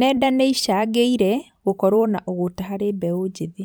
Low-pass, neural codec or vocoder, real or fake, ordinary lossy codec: none; vocoder, 44.1 kHz, 128 mel bands every 512 samples, BigVGAN v2; fake; none